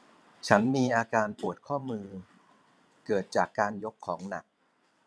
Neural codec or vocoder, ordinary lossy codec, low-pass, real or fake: vocoder, 22.05 kHz, 80 mel bands, WaveNeXt; none; none; fake